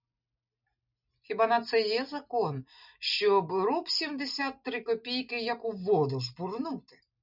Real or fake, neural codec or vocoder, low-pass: real; none; 5.4 kHz